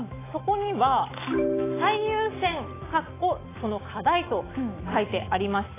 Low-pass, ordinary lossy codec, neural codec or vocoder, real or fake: 3.6 kHz; AAC, 16 kbps; autoencoder, 48 kHz, 128 numbers a frame, DAC-VAE, trained on Japanese speech; fake